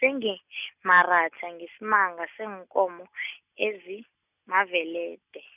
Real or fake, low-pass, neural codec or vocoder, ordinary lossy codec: real; 3.6 kHz; none; none